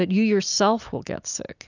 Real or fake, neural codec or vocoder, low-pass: real; none; 7.2 kHz